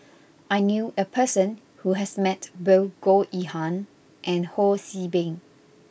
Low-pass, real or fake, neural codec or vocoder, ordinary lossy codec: none; real; none; none